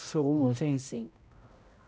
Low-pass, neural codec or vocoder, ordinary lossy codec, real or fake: none; codec, 16 kHz, 0.5 kbps, X-Codec, HuBERT features, trained on balanced general audio; none; fake